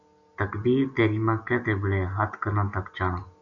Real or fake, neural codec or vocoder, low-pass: real; none; 7.2 kHz